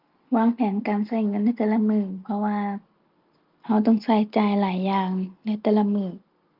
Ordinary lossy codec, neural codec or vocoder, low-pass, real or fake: Opus, 24 kbps; none; 5.4 kHz; real